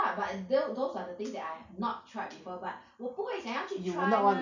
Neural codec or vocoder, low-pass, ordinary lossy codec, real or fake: none; 7.2 kHz; none; real